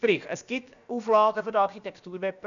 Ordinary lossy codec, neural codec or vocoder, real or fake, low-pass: none; codec, 16 kHz, about 1 kbps, DyCAST, with the encoder's durations; fake; 7.2 kHz